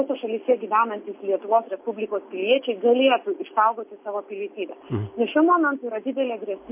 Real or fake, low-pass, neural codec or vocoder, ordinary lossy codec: real; 3.6 kHz; none; MP3, 24 kbps